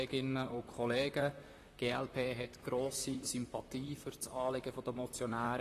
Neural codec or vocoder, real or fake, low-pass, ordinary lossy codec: vocoder, 44.1 kHz, 128 mel bands, Pupu-Vocoder; fake; 14.4 kHz; AAC, 48 kbps